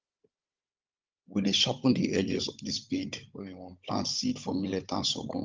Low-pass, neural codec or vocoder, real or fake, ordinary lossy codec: 7.2 kHz; codec, 16 kHz, 16 kbps, FunCodec, trained on Chinese and English, 50 frames a second; fake; Opus, 32 kbps